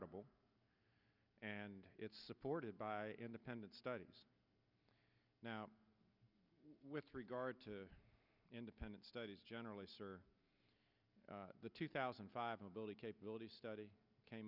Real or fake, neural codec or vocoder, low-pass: real; none; 5.4 kHz